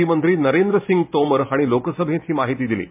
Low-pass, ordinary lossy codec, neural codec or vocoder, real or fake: 3.6 kHz; MP3, 32 kbps; none; real